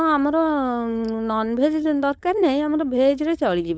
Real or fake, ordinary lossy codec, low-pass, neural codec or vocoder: fake; none; none; codec, 16 kHz, 4.8 kbps, FACodec